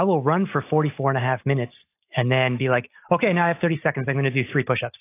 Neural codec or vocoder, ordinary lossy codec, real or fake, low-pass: none; AAC, 24 kbps; real; 3.6 kHz